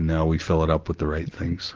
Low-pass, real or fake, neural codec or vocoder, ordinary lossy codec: 7.2 kHz; real; none; Opus, 16 kbps